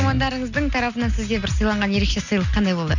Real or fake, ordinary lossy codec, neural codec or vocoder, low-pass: real; none; none; 7.2 kHz